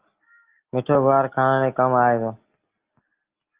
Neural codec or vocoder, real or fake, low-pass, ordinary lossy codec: none; real; 3.6 kHz; Opus, 24 kbps